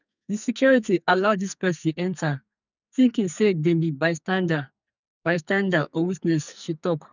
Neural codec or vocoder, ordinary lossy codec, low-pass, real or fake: codec, 44.1 kHz, 2.6 kbps, SNAC; none; 7.2 kHz; fake